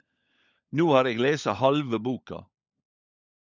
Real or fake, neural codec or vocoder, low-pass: fake; codec, 16 kHz, 16 kbps, FunCodec, trained on LibriTTS, 50 frames a second; 7.2 kHz